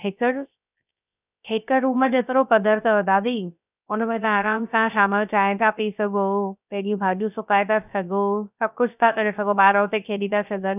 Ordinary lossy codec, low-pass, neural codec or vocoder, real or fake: none; 3.6 kHz; codec, 16 kHz, 0.3 kbps, FocalCodec; fake